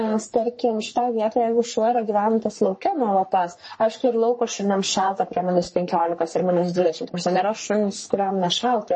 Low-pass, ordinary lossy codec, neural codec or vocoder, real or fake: 10.8 kHz; MP3, 32 kbps; codec, 44.1 kHz, 3.4 kbps, Pupu-Codec; fake